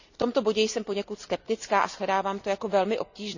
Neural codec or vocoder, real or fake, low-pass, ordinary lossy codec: none; real; 7.2 kHz; none